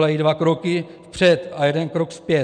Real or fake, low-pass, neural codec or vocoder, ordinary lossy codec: real; 9.9 kHz; none; MP3, 96 kbps